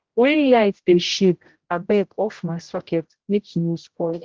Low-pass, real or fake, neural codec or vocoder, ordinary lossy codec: 7.2 kHz; fake; codec, 16 kHz, 0.5 kbps, X-Codec, HuBERT features, trained on general audio; Opus, 16 kbps